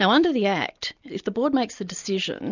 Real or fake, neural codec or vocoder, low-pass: real; none; 7.2 kHz